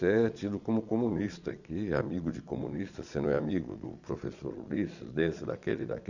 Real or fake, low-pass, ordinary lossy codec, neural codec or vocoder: fake; 7.2 kHz; none; vocoder, 22.05 kHz, 80 mel bands, Vocos